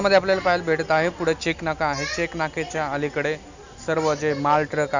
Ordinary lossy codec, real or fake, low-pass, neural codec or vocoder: none; real; 7.2 kHz; none